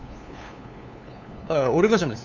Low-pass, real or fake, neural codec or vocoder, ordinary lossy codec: 7.2 kHz; fake; codec, 16 kHz, 2 kbps, FunCodec, trained on LibriTTS, 25 frames a second; none